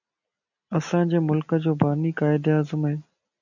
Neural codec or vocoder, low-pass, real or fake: none; 7.2 kHz; real